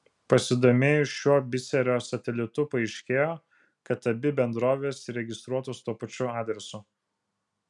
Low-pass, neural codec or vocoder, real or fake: 10.8 kHz; none; real